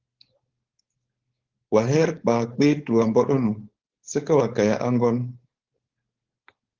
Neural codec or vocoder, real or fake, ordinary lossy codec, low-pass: codec, 16 kHz, 4.8 kbps, FACodec; fake; Opus, 16 kbps; 7.2 kHz